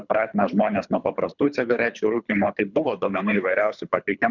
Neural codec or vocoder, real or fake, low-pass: codec, 24 kHz, 3 kbps, HILCodec; fake; 7.2 kHz